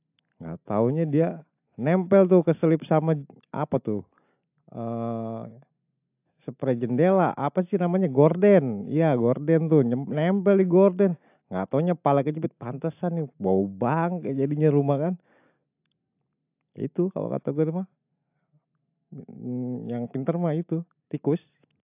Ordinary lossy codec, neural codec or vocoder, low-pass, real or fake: AAC, 32 kbps; none; 3.6 kHz; real